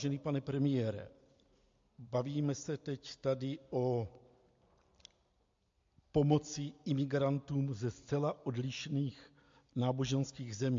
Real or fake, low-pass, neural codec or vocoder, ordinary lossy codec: real; 7.2 kHz; none; MP3, 48 kbps